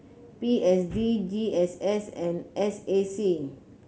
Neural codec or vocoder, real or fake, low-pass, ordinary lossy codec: none; real; none; none